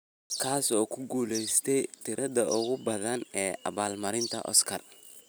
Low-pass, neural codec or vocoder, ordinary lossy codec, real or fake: none; none; none; real